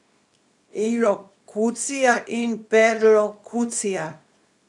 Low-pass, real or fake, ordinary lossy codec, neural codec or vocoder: 10.8 kHz; fake; none; codec, 24 kHz, 0.9 kbps, WavTokenizer, small release